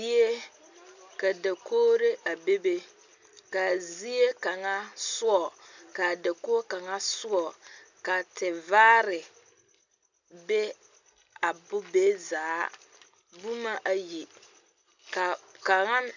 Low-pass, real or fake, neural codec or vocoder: 7.2 kHz; real; none